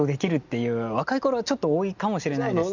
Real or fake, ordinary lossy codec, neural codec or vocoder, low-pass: real; none; none; 7.2 kHz